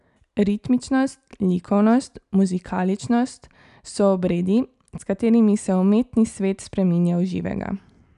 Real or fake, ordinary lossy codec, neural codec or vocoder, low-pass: real; none; none; 10.8 kHz